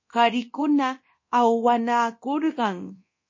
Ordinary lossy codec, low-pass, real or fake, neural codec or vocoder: MP3, 32 kbps; 7.2 kHz; fake; codec, 24 kHz, 0.9 kbps, DualCodec